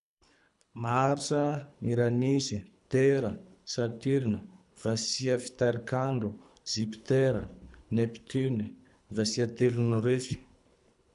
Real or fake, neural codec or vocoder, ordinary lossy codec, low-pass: fake; codec, 24 kHz, 3 kbps, HILCodec; none; 10.8 kHz